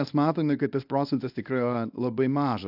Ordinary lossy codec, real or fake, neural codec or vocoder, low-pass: AAC, 48 kbps; fake; codec, 24 kHz, 0.9 kbps, WavTokenizer, medium speech release version 1; 5.4 kHz